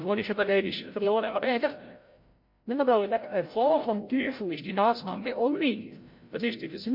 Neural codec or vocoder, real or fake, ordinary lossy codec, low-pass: codec, 16 kHz, 0.5 kbps, FreqCodec, larger model; fake; MP3, 32 kbps; 5.4 kHz